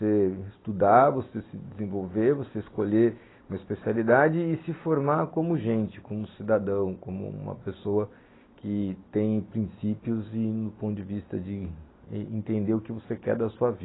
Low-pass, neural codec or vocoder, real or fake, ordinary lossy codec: 7.2 kHz; none; real; AAC, 16 kbps